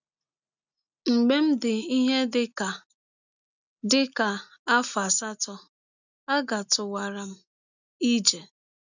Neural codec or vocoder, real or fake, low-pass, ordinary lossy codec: none; real; 7.2 kHz; none